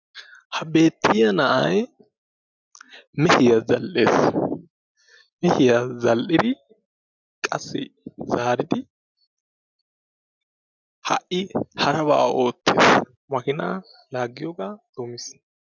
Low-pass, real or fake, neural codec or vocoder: 7.2 kHz; real; none